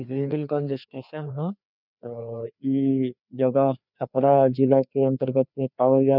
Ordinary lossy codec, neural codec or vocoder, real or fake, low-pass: none; codec, 16 kHz, 2 kbps, FreqCodec, larger model; fake; 5.4 kHz